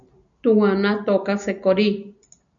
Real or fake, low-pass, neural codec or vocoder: real; 7.2 kHz; none